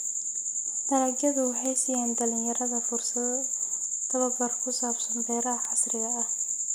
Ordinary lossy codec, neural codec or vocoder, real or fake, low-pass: none; none; real; none